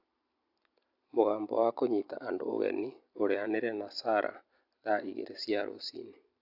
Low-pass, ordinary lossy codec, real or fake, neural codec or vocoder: 5.4 kHz; AAC, 48 kbps; fake; vocoder, 44.1 kHz, 128 mel bands every 256 samples, BigVGAN v2